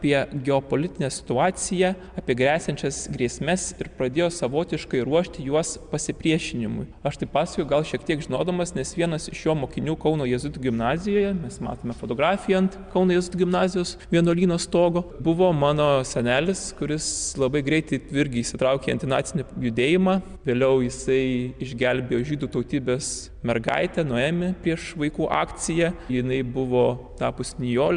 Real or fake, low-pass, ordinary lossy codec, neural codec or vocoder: real; 9.9 kHz; MP3, 96 kbps; none